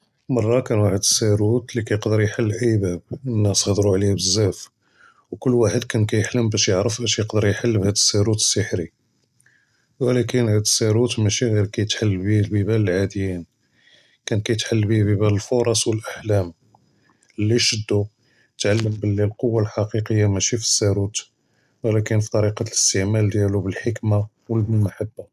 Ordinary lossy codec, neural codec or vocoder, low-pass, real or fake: none; none; 14.4 kHz; real